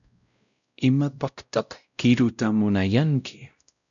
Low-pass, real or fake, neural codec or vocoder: 7.2 kHz; fake; codec, 16 kHz, 0.5 kbps, X-Codec, WavLM features, trained on Multilingual LibriSpeech